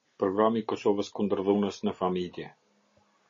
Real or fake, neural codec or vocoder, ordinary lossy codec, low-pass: real; none; MP3, 32 kbps; 7.2 kHz